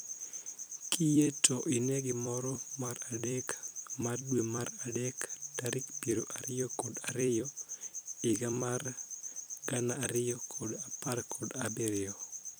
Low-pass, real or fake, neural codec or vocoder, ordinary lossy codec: none; fake; vocoder, 44.1 kHz, 128 mel bands every 256 samples, BigVGAN v2; none